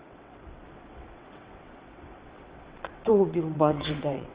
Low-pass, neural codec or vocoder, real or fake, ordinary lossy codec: 3.6 kHz; vocoder, 22.05 kHz, 80 mel bands, WaveNeXt; fake; Opus, 32 kbps